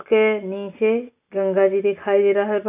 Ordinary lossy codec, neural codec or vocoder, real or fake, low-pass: none; none; real; 3.6 kHz